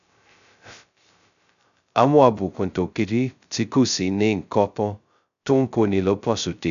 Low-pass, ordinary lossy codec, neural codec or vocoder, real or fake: 7.2 kHz; none; codec, 16 kHz, 0.2 kbps, FocalCodec; fake